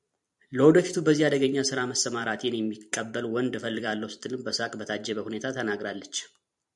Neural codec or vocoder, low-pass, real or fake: vocoder, 44.1 kHz, 128 mel bands every 256 samples, BigVGAN v2; 10.8 kHz; fake